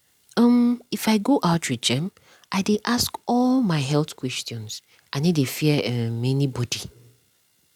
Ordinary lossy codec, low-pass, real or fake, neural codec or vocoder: none; 19.8 kHz; real; none